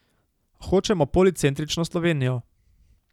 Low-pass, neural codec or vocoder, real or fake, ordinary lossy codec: 19.8 kHz; none; real; none